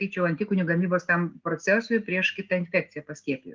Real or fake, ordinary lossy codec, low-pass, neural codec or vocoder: real; Opus, 32 kbps; 7.2 kHz; none